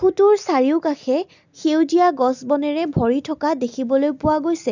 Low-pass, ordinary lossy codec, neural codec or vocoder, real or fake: 7.2 kHz; none; none; real